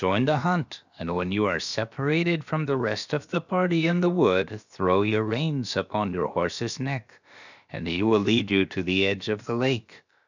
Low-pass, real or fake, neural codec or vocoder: 7.2 kHz; fake; codec, 16 kHz, about 1 kbps, DyCAST, with the encoder's durations